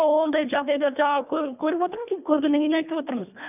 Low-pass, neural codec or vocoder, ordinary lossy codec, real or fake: 3.6 kHz; codec, 24 kHz, 1.5 kbps, HILCodec; none; fake